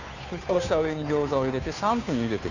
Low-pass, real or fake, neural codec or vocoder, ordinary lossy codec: 7.2 kHz; fake; codec, 16 kHz, 2 kbps, FunCodec, trained on Chinese and English, 25 frames a second; none